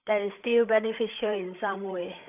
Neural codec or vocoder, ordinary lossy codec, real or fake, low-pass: codec, 16 kHz, 8 kbps, FreqCodec, larger model; none; fake; 3.6 kHz